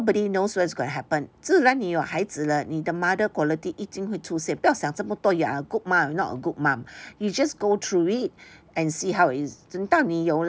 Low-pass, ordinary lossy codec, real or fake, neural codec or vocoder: none; none; real; none